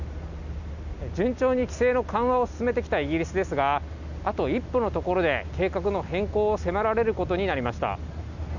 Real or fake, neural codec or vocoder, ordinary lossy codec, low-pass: real; none; none; 7.2 kHz